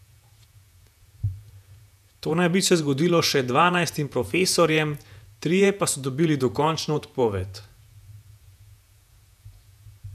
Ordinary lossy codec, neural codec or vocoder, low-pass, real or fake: none; vocoder, 48 kHz, 128 mel bands, Vocos; 14.4 kHz; fake